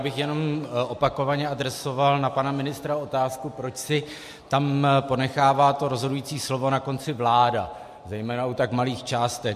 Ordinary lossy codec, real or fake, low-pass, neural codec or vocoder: MP3, 64 kbps; real; 14.4 kHz; none